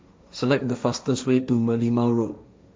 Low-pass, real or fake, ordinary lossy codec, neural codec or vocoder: none; fake; none; codec, 16 kHz, 1.1 kbps, Voila-Tokenizer